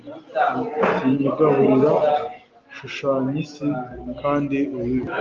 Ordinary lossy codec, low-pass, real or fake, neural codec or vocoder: Opus, 24 kbps; 7.2 kHz; real; none